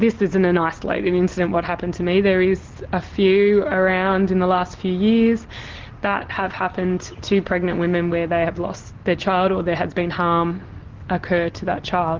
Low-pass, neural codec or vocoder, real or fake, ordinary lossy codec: 7.2 kHz; none; real; Opus, 16 kbps